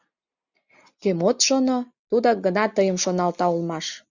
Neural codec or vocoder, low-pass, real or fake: none; 7.2 kHz; real